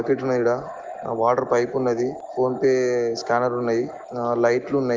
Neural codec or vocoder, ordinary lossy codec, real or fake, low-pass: none; Opus, 16 kbps; real; 7.2 kHz